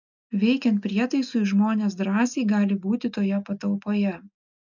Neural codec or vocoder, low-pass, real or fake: none; 7.2 kHz; real